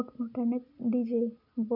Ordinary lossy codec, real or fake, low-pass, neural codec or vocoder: MP3, 48 kbps; real; 5.4 kHz; none